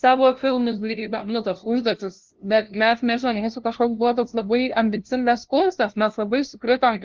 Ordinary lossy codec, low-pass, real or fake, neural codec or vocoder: Opus, 16 kbps; 7.2 kHz; fake; codec, 16 kHz, 0.5 kbps, FunCodec, trained on LibriTTS, 25 frames a second